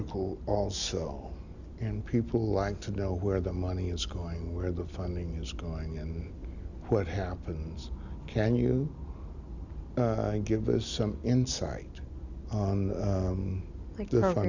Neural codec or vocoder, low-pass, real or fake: none; 7.2 kHz; real